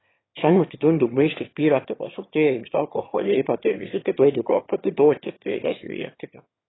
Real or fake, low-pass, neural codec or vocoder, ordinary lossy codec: fake; 7.2 kHz; autoencoder, 22.05 kHz, a latent of 192 numbers a frame, VITS, trained on one speaker; AAC, 16 kbps